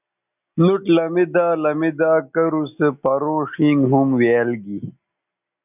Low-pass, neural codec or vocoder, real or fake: 3.6 kHz; none; real